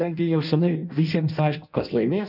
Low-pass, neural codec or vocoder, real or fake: 5.4 kHz; codec, 16 kHz in and 24 kHz out, 0.6 kbps, FireRedTTS-2 codec; fake